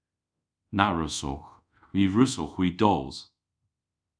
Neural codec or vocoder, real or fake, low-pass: codec, 24 kHz, 0.5 kbps, DualCodec; fake; 9.9 kHz